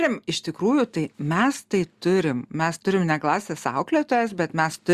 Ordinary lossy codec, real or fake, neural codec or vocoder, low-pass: Opus, 64 kbps; real; none; 14.4 kHz